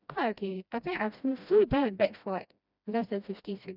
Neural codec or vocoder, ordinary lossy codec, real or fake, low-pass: codec, 16 kHz, 1 kbps, FreqCodec, smaller model; none; fake; 5.4 kHz